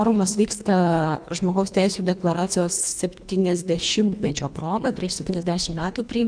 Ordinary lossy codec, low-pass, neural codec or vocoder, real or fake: MP3, 96 kbps; 9.9 kHz; codec, 24 kHz, 1.5 kbps, HILCodec; fake